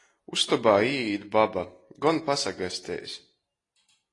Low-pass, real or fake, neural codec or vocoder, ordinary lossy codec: 10.8 kHz; real; none; AAC, 32 kbps